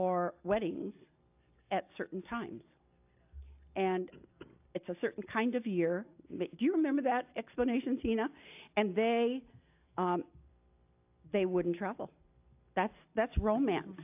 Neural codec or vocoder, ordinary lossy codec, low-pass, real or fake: none; AAC, 32 kbps; 3.6 kHz; real